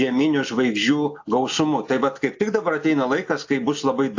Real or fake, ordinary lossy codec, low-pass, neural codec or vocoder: real; AAC, 48 kbps; 7.2 kHz; none